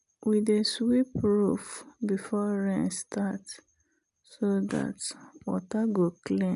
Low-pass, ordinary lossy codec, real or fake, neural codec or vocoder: 10.8 kHz; none; real; none